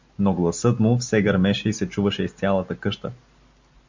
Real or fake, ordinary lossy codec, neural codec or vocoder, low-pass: real; MP3, 64 kbps; none; 7.2 kHz